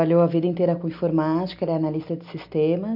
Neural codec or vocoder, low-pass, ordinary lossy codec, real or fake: none; 5.4 kHz; AAC, 48 kbps; real